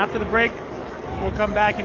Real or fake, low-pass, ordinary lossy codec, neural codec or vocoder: fake; 7.2 kHz; Opus, 24 kbps; codec, 44.1 kHz, 7.8 kbps, DAC